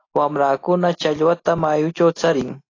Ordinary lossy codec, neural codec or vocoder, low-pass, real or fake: AAC, 32 kbps; none; 7.2 kHz; real